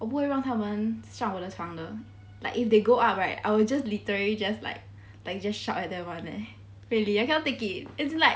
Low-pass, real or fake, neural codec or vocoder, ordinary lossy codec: none; real; none; none